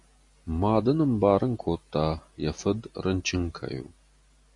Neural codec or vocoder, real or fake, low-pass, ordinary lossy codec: none; real; 10.8 kHz; MP3, 96 kbps